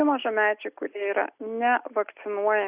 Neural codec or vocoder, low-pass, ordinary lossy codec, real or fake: none; 3.6 kHz; Opus, 64 kbps; real